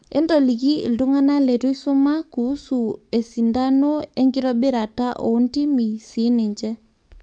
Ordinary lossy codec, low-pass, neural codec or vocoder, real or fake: MP3, 64 kbps; 9.9 kHz; codec, 44.1 kHz, 7.8 kbps, DAC; fake